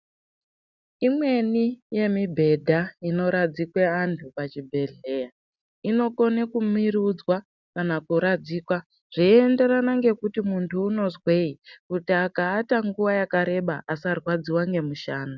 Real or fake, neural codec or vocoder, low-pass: real; none; 7.2 kHz